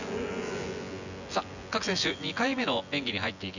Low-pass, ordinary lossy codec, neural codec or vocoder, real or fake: 7.2 kHz; none; vocoder, 24 kHz, 100 mel bands, Vocos; fake